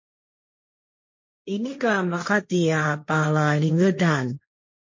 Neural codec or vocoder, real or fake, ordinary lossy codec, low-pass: codec, 16 kHz, 1.1 kbps, Voila-Tokenizer; fake; MP3, 32 kbps; 7.2 kHz